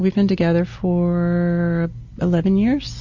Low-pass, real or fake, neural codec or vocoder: 7.2 kHz; real; none